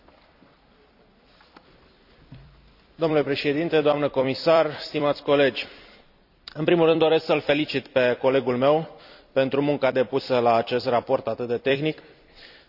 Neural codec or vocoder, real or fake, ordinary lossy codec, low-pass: vocoder, 44.1 kHz, 128 mel bands every 256 samples, BigVGAN v2; fake; none; 5.4 kHz